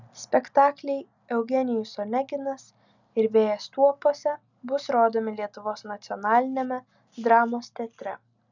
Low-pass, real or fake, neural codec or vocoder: 7.2 kHz; real; none